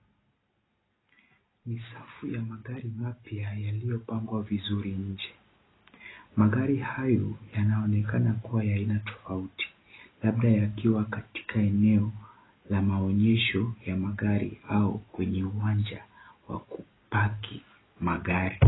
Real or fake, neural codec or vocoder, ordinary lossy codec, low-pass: real; none; AAC, 16 kbps; 7.2 kHz